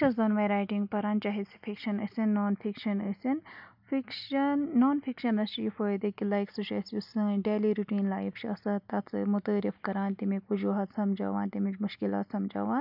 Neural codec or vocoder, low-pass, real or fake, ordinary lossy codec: none; 5.4 kHz; real; none